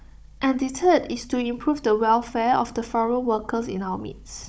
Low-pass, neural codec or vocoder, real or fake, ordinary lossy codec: none; codec, 16 kHz, 16 kbps, FunCodec, trained on Chinese and English, 50 frames a second; fake; none